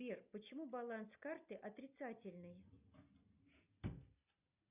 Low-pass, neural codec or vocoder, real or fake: 3.6 kHz; none; real